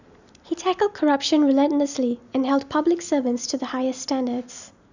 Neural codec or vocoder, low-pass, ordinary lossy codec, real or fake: none; 7.2 kHz; none; real